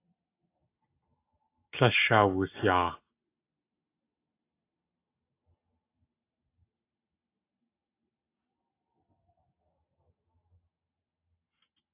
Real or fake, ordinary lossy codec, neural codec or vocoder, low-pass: real; AAC, 24 kbps; none; 3.6 kHz